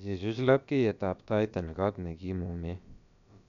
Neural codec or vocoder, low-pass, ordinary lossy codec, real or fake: codec, 16 kHz, about 1 kbps, DyCAST, with the encoder's durations; 7.2 kHz; none; fake